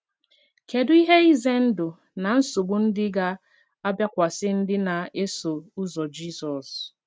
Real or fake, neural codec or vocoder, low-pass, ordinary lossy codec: real; none; none; none